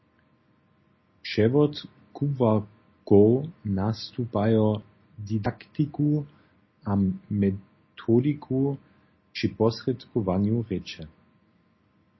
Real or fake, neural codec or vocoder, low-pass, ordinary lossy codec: real; none; 7.2 kHz; MP3, 24 kbps